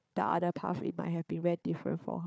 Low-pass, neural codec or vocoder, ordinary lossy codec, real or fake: none; codec, 16 kHz, 4 kbps, FunCodec, trained on Chinese and English, 50 frames a second; none; fake